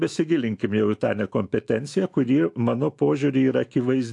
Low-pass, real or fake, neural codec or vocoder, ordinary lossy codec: 10.8 kHz; fake; autoencoder, 48 kHz, 128 numbers a frame, DAC-VAE, trained on Japanese speech; AAC, 64 kbps